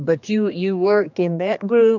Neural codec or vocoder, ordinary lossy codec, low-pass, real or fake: codec, 16 kHz, 2 kbps, X-Codec, HuBERT features, trained on general audio; MP3, 64 kbps; 7.2 kHz; fake